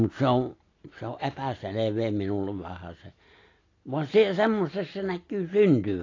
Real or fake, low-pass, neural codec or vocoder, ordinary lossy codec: real; 7.2 kHz; none; AAC, 32 kbps